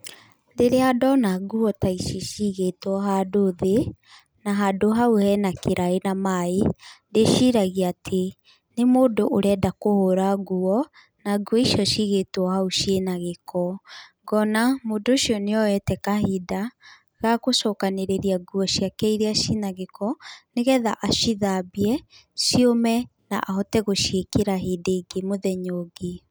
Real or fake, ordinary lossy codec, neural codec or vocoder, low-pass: real; none; none; none